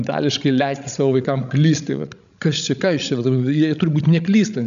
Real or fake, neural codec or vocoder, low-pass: fake; codec, 16 kHz, 16 kbps, FreqCodec, larger model; 7.2 kHz